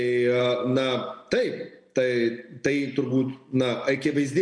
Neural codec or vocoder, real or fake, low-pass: none; real; 9.9 kHz